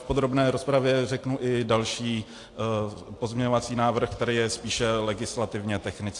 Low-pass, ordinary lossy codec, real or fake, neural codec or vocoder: 10.8 kHz; AAC, 48 kbps; real; none